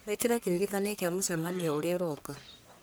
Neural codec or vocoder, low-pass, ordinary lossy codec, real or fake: codec, 44.1 kHz, 1.7 kbps, Pupu-Codec; none; none; fake